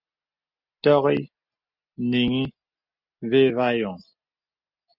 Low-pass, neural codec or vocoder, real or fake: 5.4 kHz; none; real